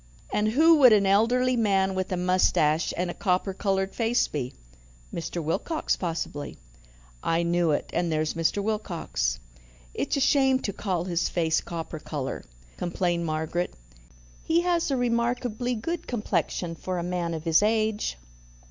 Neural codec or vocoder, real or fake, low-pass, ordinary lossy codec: none; real; 7.2 kHz; MP3, 64 kbps